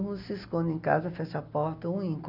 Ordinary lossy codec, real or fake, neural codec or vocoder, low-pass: none; real; none; 5.4 kHz